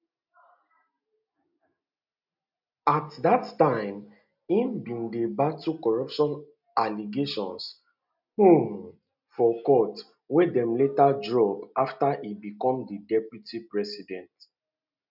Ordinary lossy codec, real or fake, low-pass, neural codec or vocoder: none; real; 5.4 kHz; none